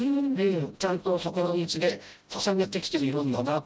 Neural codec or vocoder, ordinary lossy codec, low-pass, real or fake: codec, 16 kHz, 0.5 kbps, FreqCodec, smaller model; none; none; fake